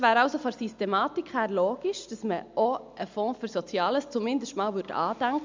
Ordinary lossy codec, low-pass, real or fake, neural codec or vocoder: MP3, 64 kbps; 7.2 kHz; real; none